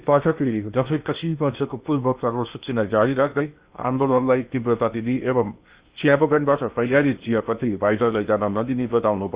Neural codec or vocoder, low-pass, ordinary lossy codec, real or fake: codec, 16 kHz in and 24 kHz out, 0.8 kbps, FocalCodec, streaming, 65536 codes; 3.6 kHz; Opus, 64 kbps; fake